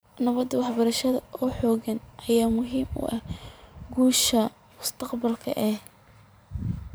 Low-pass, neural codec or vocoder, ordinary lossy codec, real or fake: none; vocoder, 44.1 kHz, 128 mel bands every 512 samples, BigVGAN v2; none; fake